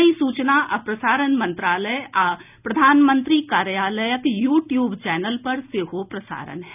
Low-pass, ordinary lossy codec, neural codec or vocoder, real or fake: 3.6 kHz; none; none; real